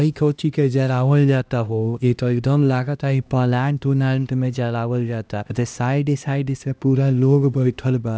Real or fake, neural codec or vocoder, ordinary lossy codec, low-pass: fake; codec, 16 kHz, 1 kbps, X-Codec, HuBERT features, trained on LibriSpeech; none; none